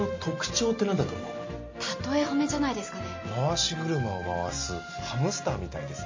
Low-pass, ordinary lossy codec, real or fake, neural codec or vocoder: 7.2 kHz; MP3, 32 kbps; real; none